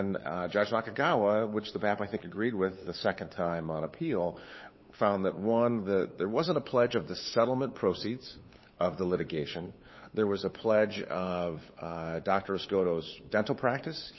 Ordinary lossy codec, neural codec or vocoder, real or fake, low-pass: MP3, 24 kbps; codec, 16 kHz, 8 kbps, FunCodec, trained on LibriTTS, 25 frames a second; fake; 7.2 kHz